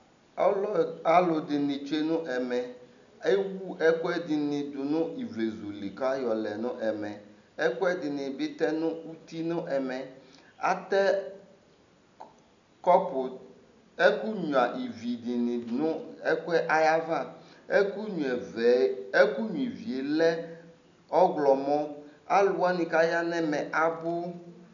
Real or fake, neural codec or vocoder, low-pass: real; none; 7.2 kHz